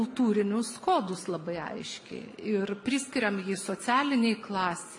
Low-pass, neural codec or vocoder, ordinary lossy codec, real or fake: 10.8 kHz; none; AAC, 64 kbps; real